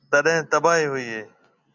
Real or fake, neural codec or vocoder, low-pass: real; none; 7.2 kHz